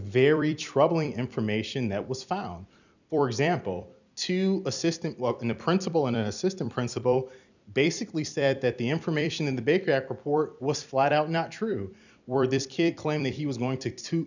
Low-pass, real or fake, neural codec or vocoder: 7.2 kHz; fake; vocoder, 44.1 kHz, 128 mel bands every 256 samples, BigVGAN v2